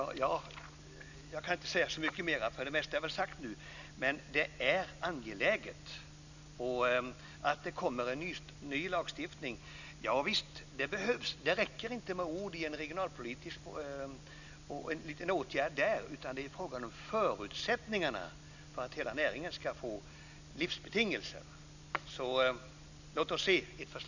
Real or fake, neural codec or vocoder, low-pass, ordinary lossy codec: real; none; 7.2 kHz; none